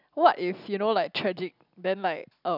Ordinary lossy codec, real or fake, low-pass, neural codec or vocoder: none; real; 5.4 kHz; none